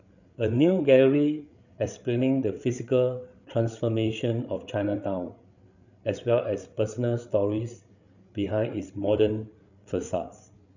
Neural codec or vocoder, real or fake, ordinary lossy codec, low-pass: codec, 16 kHz, 8 kbps, FreqCodec, larger model; fake; none; 7.2 kHz